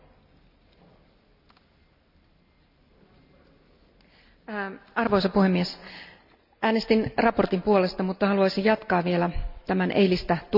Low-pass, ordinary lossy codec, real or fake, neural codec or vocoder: 5.4 kHz; none; real; none